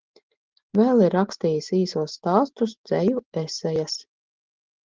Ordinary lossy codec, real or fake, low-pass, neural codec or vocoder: Opus, 16 kbps; real; 7.2 kHz; none